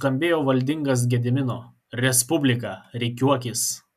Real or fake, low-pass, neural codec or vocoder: real; 14.4 kHz; none